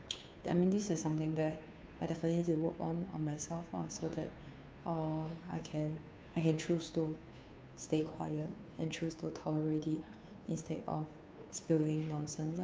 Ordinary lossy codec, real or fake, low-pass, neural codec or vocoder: none; fake; none; codec, 16 kHz, 2 kbps, FunCodec, trained on Chinese and English, 25 frames a second